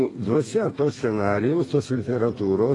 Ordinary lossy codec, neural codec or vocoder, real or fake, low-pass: AAC, 32 kbps; codec, 32 kHz, 1.9 kbps, SNAC; fake; 10.8 kHz